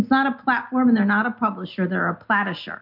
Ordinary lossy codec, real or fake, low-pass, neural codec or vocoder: MP3, 48 kbps; real; 5.4 kHz; none